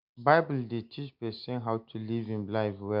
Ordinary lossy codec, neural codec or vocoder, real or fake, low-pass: MP3, 48 kbps; none; real; 5.4 kHz